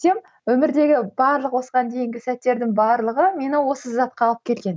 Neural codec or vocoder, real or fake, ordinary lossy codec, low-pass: none; real; none; none